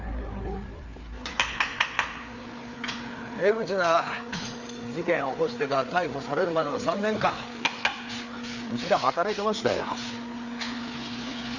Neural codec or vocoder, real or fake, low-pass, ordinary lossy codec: codec, 16 kHz, 4 kbps, FreqCodec, larger model; fake; 7.2 kHz; none